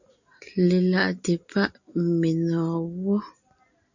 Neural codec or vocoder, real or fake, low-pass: none; real; 7.2 kHz